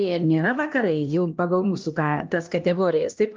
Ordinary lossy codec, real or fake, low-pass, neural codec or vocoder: Opus, 24 kbps; fake; 7.2 kHz; codec, 16 kHz, 1 kbps, X-Codec, HuBERT features, trained on LibriSpeech